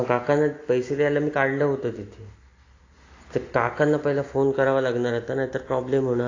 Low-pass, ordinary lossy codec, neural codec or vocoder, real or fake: 7.2 kHz; AAC, 32 kbps; none; real